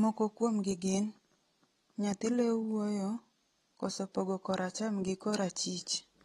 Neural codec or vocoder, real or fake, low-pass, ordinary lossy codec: none; real; 19.8 kHz; AAC, 32 kbps